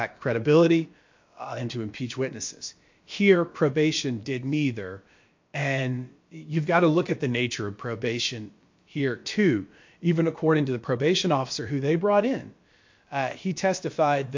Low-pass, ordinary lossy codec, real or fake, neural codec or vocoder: 7.2 kHz; MP3, 48 kbps; fake; codec, 16 kHz, about 1 kbps, DyCAST, with the encoder's durations